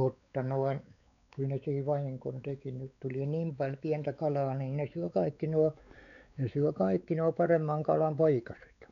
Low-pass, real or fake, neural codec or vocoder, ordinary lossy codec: 7.2 kHz; fake; codec, 16 kHz, 4 kbps, X-Codec, WavLM features, trained on Multilingual LibriSpeech; none